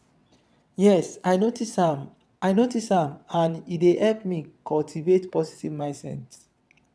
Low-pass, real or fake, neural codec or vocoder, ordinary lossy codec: none; fake; vocoder, 22.05 kHz, 80 mel bands, WaveNeXt; none